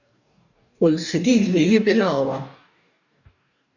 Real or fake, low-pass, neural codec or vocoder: fake; 7.2 kHz; codec, 44.1 kHz, 2.6 kbps, DAC